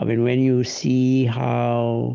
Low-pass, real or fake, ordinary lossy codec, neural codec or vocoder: 7.2 kHz; real; Opus, 32 kbps; none